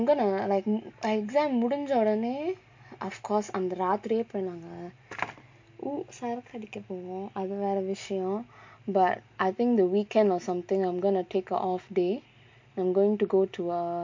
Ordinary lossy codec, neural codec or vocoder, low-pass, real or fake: MP3, 48 kbps; none; 7.2 kHz; real